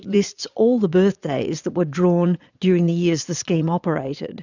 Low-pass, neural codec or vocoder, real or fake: 7.2 kHz; vocoder, 22.05 kHz, 80 mel bands, Vocos; fake